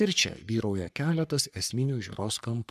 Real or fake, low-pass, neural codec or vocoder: fake; 14.4 kHz; codec, 44.1 kHz, 3.4 kbps, Pupu-Codec